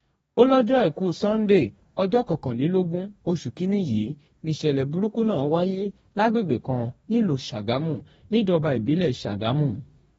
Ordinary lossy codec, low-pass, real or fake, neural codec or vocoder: AAC, 24 kbps; 19.8 kHz; fake; codec, 44.1 kHz, 2.6 kbps, DAC